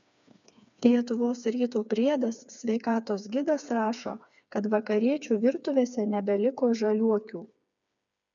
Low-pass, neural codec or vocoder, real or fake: 7.2 kHz; codec, 16 kHz, 4 kbps, FreqCodec, smaller model; fake